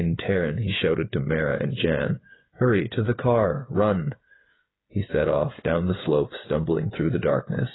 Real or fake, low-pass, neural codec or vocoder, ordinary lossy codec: fake; 7.2 kHz; codec, 16 kHz, 8 kbps, FreqCodec, smaller model; AAC, 16 kbps